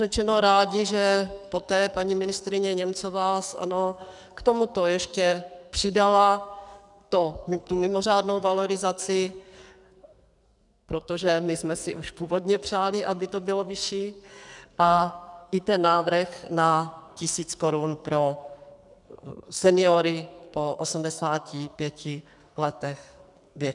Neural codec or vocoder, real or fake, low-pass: codec, 44.1 kHz, 2.6 kbps, SNAC; fake; 10.8 kHz